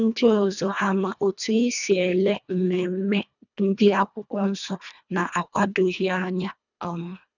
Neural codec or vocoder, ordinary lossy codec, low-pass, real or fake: codec, 24 kHz, 1.5 kbps, HILCodec; none; 7.2 kHz; fake